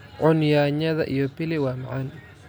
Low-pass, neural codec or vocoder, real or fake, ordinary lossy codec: none; none; real; none